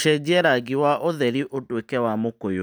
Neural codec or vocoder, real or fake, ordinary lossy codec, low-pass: none; real; none; none